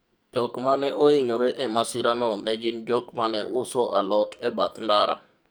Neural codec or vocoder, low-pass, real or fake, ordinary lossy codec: codec, 44.1 kHz, 2.6 kbps, SNAC; none; fake; none